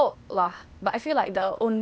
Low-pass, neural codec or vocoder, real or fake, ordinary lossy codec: none; codec, 16 kHz, 0.8 kbps, ZipCodec; fake; none